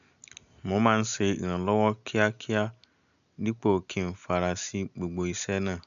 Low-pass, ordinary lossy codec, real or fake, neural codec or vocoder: 7.2 kHz; MP3, 96 kbps; real; none